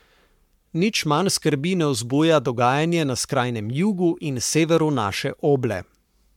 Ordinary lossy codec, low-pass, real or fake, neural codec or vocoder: MP3, 96 kbps; 19.8 kHz; fake; codec, 44.1 kHz, 7.8 kbps, Pupu-Codec